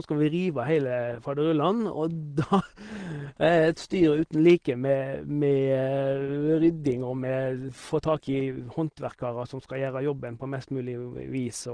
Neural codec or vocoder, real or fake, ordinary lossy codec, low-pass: none; real; Opus, 16 kbps; 9.9 kHz